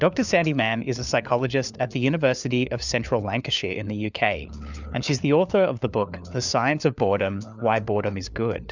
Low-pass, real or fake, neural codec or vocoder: 7.2 kHz; fake; codec, 16 kHz, 4 kbps, FunCodec, trained on LibriTTS, 50 frames a second